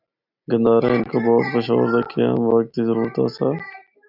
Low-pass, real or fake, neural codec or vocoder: 5.4 kHz; real; none